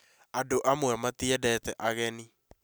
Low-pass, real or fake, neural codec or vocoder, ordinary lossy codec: none; real; none; none